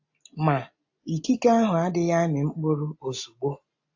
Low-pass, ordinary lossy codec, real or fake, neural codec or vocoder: 7.2 kHz; AAC, 48 kbps; real; none